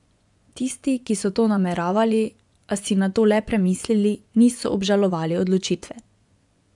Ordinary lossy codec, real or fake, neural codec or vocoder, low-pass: none; fake; vocoder, 24 kHz, 100 mel bands, Vocos; 10.8 kHz